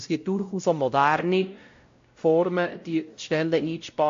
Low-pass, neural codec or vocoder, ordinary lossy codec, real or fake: 7.2 kHz; codec, 16 kHz, 0.5 kbps, X-Codec, WavLM features, trained on Multilingual LibriSpeech; AAC, 48 kbps; fake